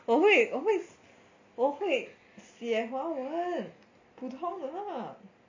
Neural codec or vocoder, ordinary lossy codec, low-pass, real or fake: none; MP3, 64 kbps; 7.2 kHz; real